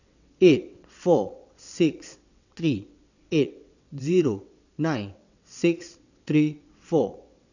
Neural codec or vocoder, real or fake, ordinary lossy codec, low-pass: vocoder, 22.05 kHz, 80 mel bands, WaveNeXt; fake; none; 7.2 kHz